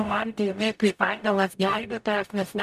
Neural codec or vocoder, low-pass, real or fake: codec, 44.1 kHz, 0.9 kbps, DAC; 14.4 kHz; fake